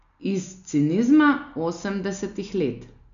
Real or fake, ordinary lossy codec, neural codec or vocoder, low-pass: real; none; none; 7.2 kHz